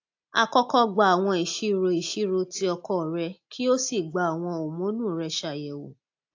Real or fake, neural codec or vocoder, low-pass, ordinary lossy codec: real; none; 7.2 kHz; AAC, 48 kbps